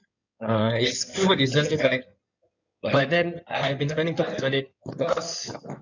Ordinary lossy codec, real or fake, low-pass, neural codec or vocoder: none; fake; 7.2 kHz; codec, 16 kHz in and 24 kHz out, 2.2 kbps, FireRedTTS-2 codec